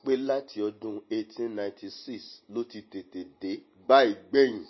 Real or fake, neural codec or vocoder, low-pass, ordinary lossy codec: real; none; 7.2 kHz; MP3, 24 kbps